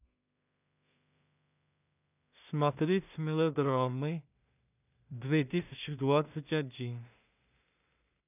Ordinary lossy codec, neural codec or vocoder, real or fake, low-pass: none; codec, 16 kHz in and 24 kHz out, 0.4 kbps, LongCat-Audio-Codec, two codebook decoder; fake; 3.6 kHz